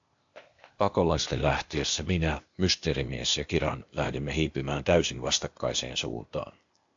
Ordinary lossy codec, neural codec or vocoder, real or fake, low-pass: AAC, 64 kbps; codec, 16 kHz, 0.8 kbps, ZipCodec; fake; 7.2 kHz